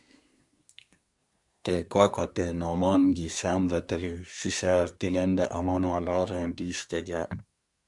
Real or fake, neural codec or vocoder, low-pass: fake; codec, 24 kHz, 1 kbps, SNAC; 10.8 kHz